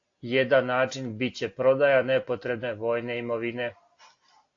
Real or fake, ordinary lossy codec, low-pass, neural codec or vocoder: real; AAC, 48 kbps; 7.2 kHz; none